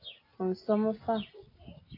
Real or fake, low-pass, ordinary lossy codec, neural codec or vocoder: real; 5.4 kHz; AAC, 24 kbps; none